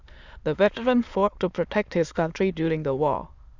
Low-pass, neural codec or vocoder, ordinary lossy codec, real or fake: 7.2 kHz; autoencoder, 22.05 kHz, a latent of 192 numbers a frame, VITS, trained on many speakers; Opus, 64 kbps; fake